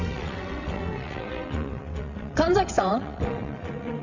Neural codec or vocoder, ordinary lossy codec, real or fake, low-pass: vocoder, 22.05 kHz, 80 mel bands, WaveNeXt; none; fake; 7.2 kHz